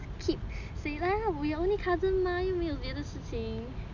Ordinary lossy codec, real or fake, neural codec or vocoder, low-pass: none; real; none; 7.2 kHz